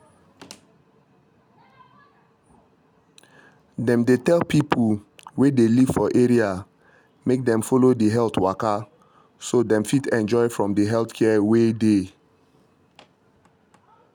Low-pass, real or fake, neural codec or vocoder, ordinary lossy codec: 19.8 kHz; real; none; none